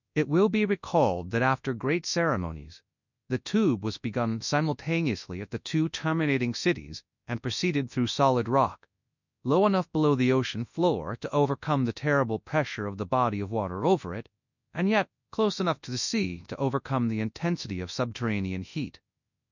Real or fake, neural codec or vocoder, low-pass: fake; codec, 24 kHz, 0.9 kbps, WavTokenizer, large speech release; 7.2 kHz